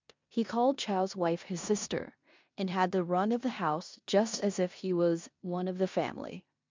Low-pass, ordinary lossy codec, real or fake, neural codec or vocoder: 7.2 kHz; MP3, 64 kbps; fake; codec, 16 kHz in and 24 kHz out, 0.9 kbps, LongCat-Audio-Codec, four codebook decoder